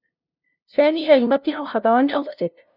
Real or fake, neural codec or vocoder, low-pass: fake; codec, 16 kHz, 0.5 kbps, FunCodec, trained on LibriTTS, 25 frames a second; 5.4 kHz